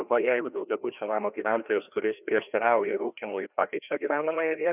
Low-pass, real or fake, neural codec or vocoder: 3.6 kHz; fake; codec, 16 kHz, 1 kbps, FreqCodec, larger model